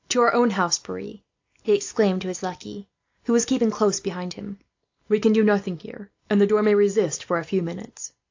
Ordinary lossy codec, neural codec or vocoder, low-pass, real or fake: AAC, 48 kbps; none; 7.2 kHz; real